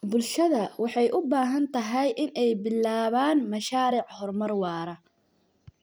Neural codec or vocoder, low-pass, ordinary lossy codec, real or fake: none; none; none; real